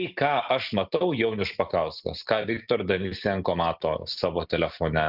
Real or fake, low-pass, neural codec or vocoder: real; 5.4 kHz; none